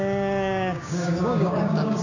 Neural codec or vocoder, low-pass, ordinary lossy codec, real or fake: codec, 16 kHz, 6 kbps, DAC; 7.2 kHz; none; fake